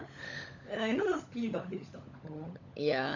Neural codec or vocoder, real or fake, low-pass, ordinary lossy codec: codec, 16 kHz, 16 kbps, FunCodec, trained on LibriTTS, 50 frames a second; fake; 7.2 kHz; none